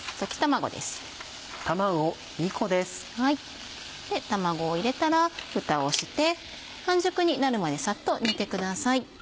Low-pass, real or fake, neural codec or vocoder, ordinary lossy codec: none; real; none; none